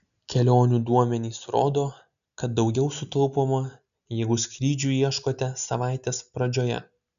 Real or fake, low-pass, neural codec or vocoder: real; 7.2 kHz; none